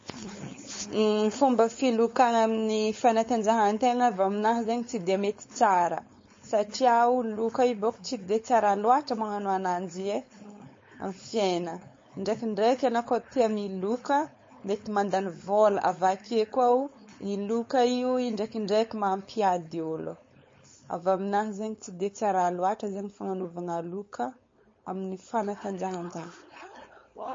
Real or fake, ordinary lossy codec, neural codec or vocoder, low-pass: fake; MP3, 32 kbps; codec, 16 kHz, 4.8 kbps, FACodec; 7.2 kHz